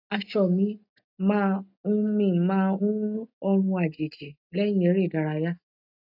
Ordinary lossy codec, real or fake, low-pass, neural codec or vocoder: none; real; 5.4 kHz; none